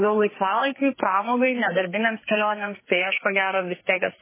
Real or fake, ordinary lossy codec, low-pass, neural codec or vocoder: fake; MP3, 16 kbps; 3.6 kHz; codec, 44.1 kHz, 2.6 kbps, SNAC